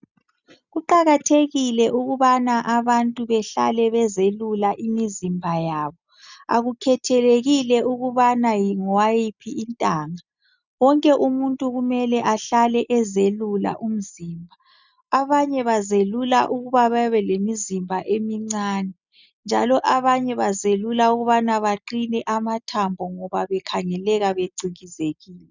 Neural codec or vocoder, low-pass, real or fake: none; 7.2 kHz; real